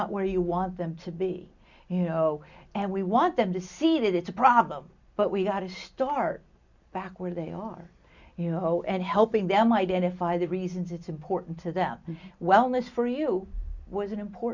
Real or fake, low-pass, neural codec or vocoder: real; 7.2 kHz; none